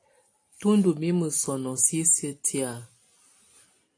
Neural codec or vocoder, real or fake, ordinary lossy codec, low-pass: none; real; AAC, 48 kbps; 9.9 kHz